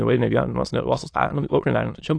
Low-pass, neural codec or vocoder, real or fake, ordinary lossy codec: 9.9 kHz; autoencoder, 22.05 kHz, a latent of 192 numbers a frame, VITS, trained on many speakers; fake; AAC, 48 kbps